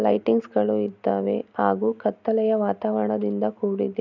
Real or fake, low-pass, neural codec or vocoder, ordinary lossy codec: real; 7.2 kHz; none; none